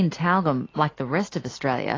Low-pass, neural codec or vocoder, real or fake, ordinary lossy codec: 7.2 kHz; none; real; AAC, 32 kbps